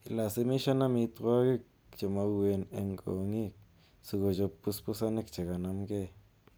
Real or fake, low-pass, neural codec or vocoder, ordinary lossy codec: real; none; none; none